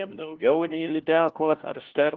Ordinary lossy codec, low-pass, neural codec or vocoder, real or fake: Opus, 16 kbps; 7.2 kHz; codec, 16 kHz, 1 kbps, FunCodec, trained on LibriTTS, 50 frames a second; fake